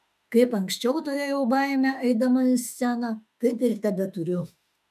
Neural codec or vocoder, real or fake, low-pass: autoencoder, 48 kHz, 32 numbers a frame, DAC-VAE, trained on Japanese speech; fake; 14.4 kHz